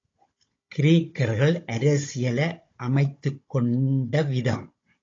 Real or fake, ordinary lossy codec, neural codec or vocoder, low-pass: fake; AAC, 32 kbps; codec, 16 kHz, 16 kbps, FunCodec, trained on Chinese and English, 50 frames a second; 7.2 kHz